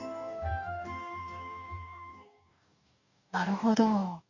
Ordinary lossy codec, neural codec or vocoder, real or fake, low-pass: none; codec, 44.1 kHz, 2.6 kbps, DAC; fake; 7.2 kHz